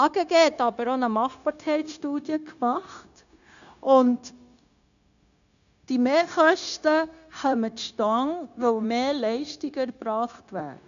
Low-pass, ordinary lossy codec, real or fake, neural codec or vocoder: 7.2 kHz; none; fake; codec, 16 kHz, 0.9 kbps, LongCat-Audio-Codec